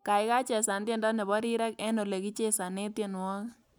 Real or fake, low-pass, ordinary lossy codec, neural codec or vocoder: real; none; none; none